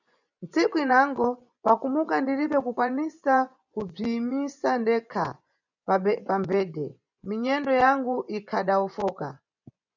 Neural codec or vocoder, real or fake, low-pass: vocoder, 24 kHz, 100 mel bands, Vocos; fake; 7.2 kHz